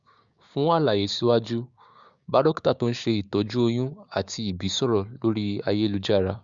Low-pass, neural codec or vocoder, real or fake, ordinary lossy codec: 7.2 kHz; codec, 16 kHz, 6 kbps, DAC; fake; Opus, 64 kbps